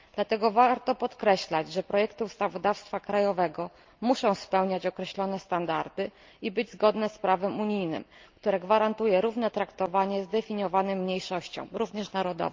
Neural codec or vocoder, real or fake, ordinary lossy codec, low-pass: none; real; Opus, 24 kbps; 7.2 kHz